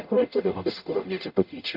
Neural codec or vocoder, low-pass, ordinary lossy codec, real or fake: codec, 44.1 kHz, 0.9 kbps, DAC; 5.4 kHz; none; fake